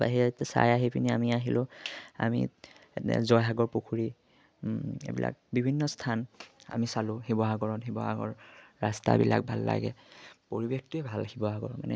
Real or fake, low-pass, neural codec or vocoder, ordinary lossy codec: real; none; none; none